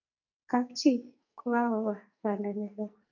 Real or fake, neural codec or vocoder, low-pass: fake; codec, 44.1 kHz, 2.6 kbps, SNAC; 7.2 kHz